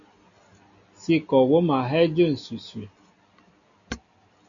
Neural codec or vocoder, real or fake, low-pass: none; real; 7.2 kHz